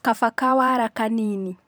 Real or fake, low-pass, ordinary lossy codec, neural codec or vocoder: fake; none; none; vocoder, 44.1 kHz, 128 mel bands every 512 samples, BigVGAN v2